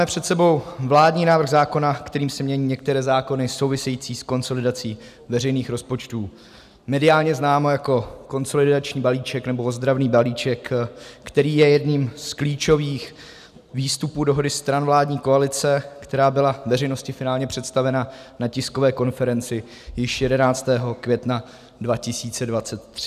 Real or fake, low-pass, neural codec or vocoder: real; 14.4 kHz; none